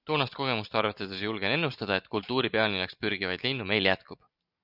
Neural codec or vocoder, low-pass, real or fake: none; 5.4 kHz; real